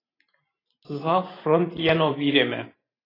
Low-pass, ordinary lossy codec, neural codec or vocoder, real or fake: 5.4 kHz; AAC, 24 kbps; vocoder, 44.1 kHz, 128 mel bands every 512 samples, BigVGAN v2; fake